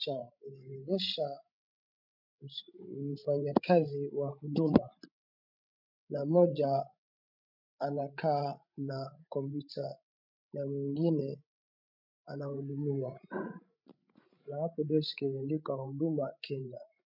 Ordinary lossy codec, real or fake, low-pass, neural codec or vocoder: MP3, 48 kbps; fake; 5.4 kHz; codec, 16 kHz, 16 kbps, FreqCodec, larger model